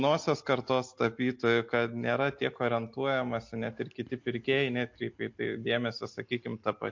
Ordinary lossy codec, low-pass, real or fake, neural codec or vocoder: MP3, 48 kbps; 7.2 kHz; real; none